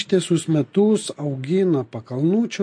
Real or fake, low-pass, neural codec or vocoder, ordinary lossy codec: real; 9.9 kHz; none; MP3, 48 kbps